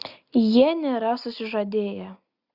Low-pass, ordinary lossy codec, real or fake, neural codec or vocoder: 5.4 kHz; Opus, 64 kbps; real; none